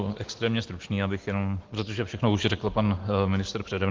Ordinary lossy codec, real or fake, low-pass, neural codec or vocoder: Opus, 16 kbps; real; 7.2 kHz; none